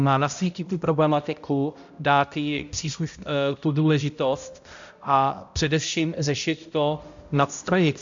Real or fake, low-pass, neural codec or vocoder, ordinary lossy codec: fake; 7.2 kHz; codec, 16 kHz, 0.5 kbps, X-Codec, HuBERT features, trained on balanced general audio; MP3, 64 kbps